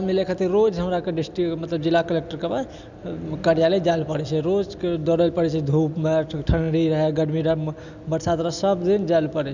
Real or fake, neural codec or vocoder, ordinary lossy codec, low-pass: real; none; none; 7.2 kHz